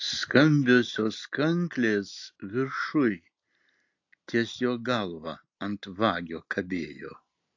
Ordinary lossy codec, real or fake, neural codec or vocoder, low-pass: AAC, 48 kbps; real; none; 7.2 kHz